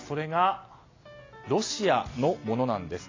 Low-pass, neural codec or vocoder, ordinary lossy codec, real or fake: 7.2 kHz; none; AAC, 32 kbps; real